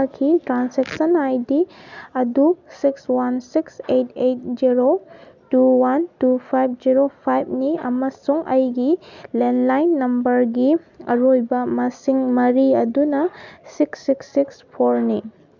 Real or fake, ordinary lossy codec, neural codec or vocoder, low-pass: real; none; none; 7.2 kHz